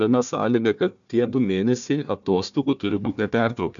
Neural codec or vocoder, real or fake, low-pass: codec, 16 kHz, 1 kbps, FunCodec, trained on Chinese and English, 50 frames a second; fake; 7.2 kHz